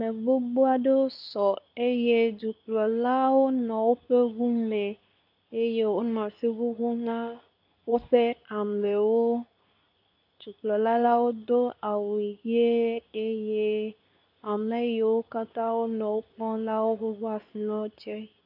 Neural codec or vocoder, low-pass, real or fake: codec, 24 kHz, 0.9 kbps, WavTokenizer, medium speech release version 2; 5.4 kHz; fake